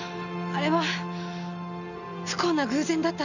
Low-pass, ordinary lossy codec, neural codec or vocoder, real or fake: 7.2 kHz; none; none; real